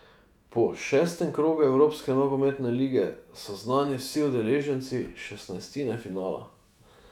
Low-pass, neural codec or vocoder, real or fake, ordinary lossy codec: 19.8 kHz; autoencoder, 48 kHz, 128 numbers a frame, DAC-VAE, trained on Japanese speech; fake; none